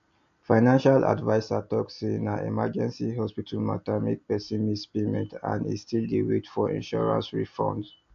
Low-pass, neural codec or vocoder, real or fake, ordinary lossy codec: 7.2 kHz; none; real; AAC, 64 kbps